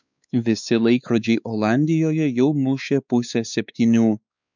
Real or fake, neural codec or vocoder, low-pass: fake; codec, 16 kHz, 4 kbps, X-Codec, WavLM features, trained on Multilingual LibriSpeech; 7.2 kHz